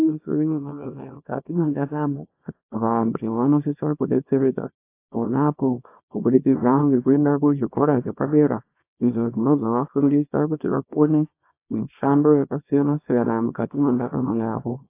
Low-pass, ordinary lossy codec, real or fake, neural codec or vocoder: 3.6 kHz; AAC, 24 kbps; fake; codec, 24 kHz, 0.9 kbps, WavTokenizer, small release